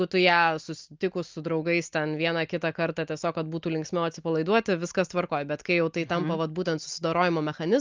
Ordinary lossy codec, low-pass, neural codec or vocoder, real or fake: Opus, 32 kbps; 7.2 kHz; none; real